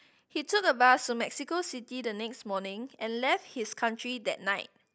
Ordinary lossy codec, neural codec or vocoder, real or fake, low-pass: none; none; real; none